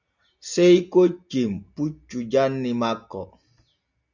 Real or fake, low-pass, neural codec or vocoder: real; 7.2 kHz; none